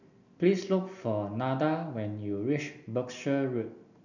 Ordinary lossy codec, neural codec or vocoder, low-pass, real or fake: none; none; 7.2 kHz; real